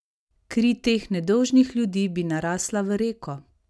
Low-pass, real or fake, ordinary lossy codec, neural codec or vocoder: none; real; none; none